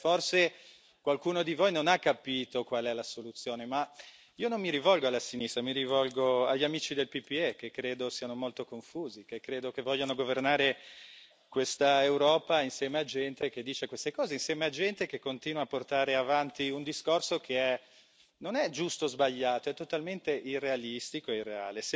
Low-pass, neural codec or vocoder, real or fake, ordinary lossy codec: none; none; real; none